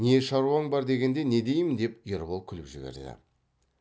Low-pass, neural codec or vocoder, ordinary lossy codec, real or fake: none; none; none; real